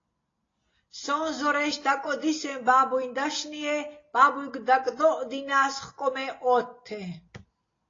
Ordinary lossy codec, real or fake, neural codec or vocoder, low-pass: AAC, 32 kbps; real; none; 7.2 kHz